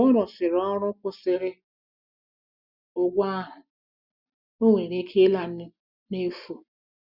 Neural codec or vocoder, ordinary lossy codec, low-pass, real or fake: none; Opus, 64 kbps; 5.4 kHz; real